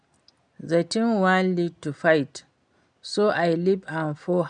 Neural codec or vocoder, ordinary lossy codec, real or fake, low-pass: none; none; real; 9.9 kHz